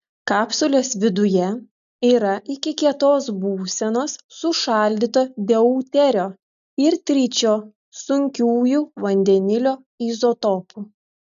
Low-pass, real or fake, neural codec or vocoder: 7.2 kHz; real; none